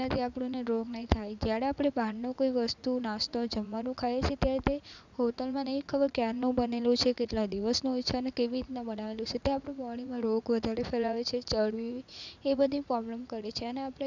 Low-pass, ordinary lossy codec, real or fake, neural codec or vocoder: 7.2 kHz; none; fake; vocoder, 44.1 kHz, 80 mel bands, Vocos